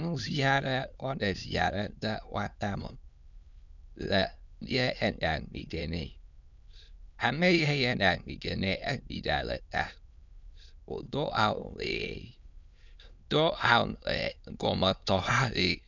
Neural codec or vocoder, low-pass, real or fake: autoencoder, 22.05 kHz, a latent of 192 numbers a frame, VITS, trained on many speakers; 7.2 kHz; fake